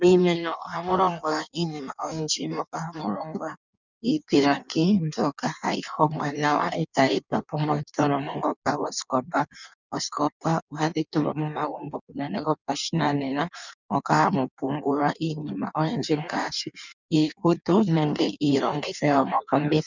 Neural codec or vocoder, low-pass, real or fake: codec, 16 kHz in and 24 kHz out, 1.1 kbps, FireRedTTS-2 codec; 7.2 kHz; fake